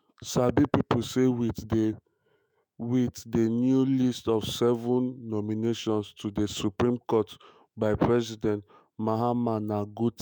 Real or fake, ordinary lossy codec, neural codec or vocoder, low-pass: fake; none; autoencoder, 48 kHz, 128 numbers a frame, DAC-VAE, trained on Japanese speech; none